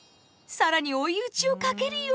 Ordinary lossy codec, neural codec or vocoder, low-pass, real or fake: none; none; none; real